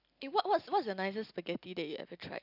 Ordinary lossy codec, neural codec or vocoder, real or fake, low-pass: none; none; real; 5.4 kHz